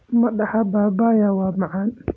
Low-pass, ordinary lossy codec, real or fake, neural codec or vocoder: none; none; real; none